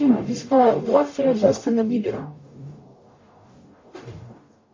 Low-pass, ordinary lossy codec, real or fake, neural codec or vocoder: 7.2 kHz; MP3, 32 kbps; fake; codec, 44.1 kHz, 0.9 kbps, DAC